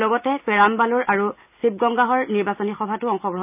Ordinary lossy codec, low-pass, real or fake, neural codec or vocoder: none; 3.6 kHz; real; none